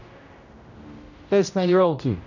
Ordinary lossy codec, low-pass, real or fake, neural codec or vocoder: none; 7.2 kHz; fake; codec, 16 kHz, 0.5 kbps, X-Codec, HuBERT features, trained on general audio